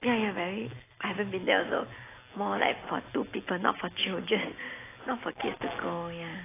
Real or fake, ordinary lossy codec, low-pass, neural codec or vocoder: real; AAC, 16 kbps; 3.6 kHz; none